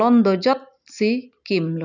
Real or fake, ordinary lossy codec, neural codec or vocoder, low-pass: real; none; none; 7.2 kHz